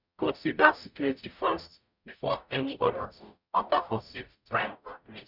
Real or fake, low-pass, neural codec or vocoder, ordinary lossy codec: fake; 5.4 kHz; codec, 44.1 kHz, 0.9 kbps, DAC; none